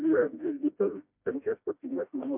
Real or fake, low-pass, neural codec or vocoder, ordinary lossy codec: fake; 3.6 kHz; codec, 16 kHz, 1 kbps, FreqCodec, smaller model; MP3, 32 kbps